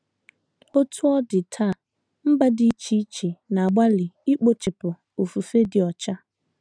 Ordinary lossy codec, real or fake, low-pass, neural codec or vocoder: none; real; 9.9 kHz; none